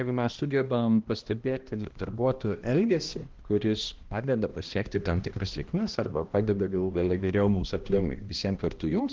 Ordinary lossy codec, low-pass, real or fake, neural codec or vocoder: Opus, 16 kbps; 7.2 kHz; fake; codec, 16 kHz, 1 kbps, X-Codec, HuBERT features, trained on balanced general audio